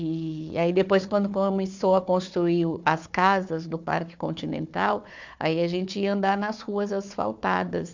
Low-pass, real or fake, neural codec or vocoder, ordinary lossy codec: 7.2 kHz; fake; codec, 16 kHz, 4 kbps, FunCodec, trained on Chinese and English, 50 frames a second; MP3, 64 kbps